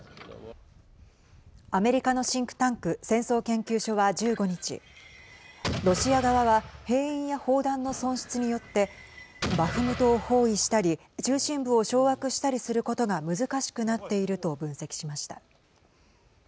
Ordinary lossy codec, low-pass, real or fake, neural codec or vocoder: none; none; real; none